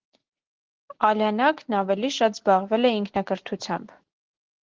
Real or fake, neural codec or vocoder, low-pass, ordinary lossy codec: real; none; 7.2 kHz; Opus, 16 kbps